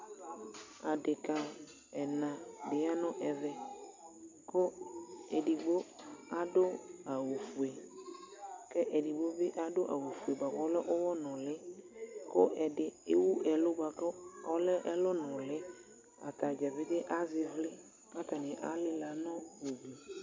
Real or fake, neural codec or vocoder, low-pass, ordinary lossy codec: real; none; 7.2 kHz; MP3, 64 kbps